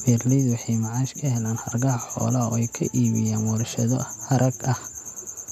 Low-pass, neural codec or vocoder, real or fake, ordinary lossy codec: 14.4 kHz; none; real; none